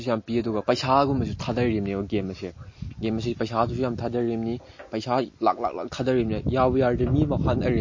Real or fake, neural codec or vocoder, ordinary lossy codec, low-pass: real; none; MP3, 32 kbps; 7.2 kHz